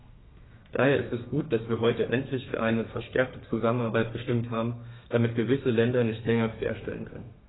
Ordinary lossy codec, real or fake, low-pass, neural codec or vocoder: AAC, 16 kbps; fake; 7.2 kHz; codec, 32 kHz, 1.9 kbps, SNAC